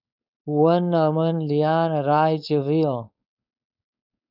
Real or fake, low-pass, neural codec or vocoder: fake; 5.4 kHz; codec, 16 kHz, 4.8 kbps, FACodec